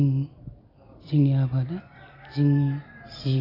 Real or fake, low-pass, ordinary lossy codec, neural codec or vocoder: real; 5.4 kHz; AAC, 24 kbps; none